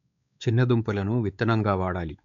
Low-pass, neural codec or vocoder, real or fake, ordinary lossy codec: 7.2 kHz; codec, 16 kHz, 4 kbps, X-Codec, WavLM features, trained on Multilingual LibriSpeech; fake; MP3, 96 kbps